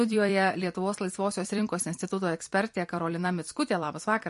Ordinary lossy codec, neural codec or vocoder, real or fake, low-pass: MP3, 48 kbps; vocoder, 44.1 kHz, 128 mel bands every 256 samples, BigVGAN v2; fake; 14.4 kHz